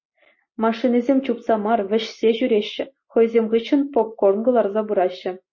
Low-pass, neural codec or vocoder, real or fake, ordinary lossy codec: 7.2 kHz; none; real; MP3, 32 kbps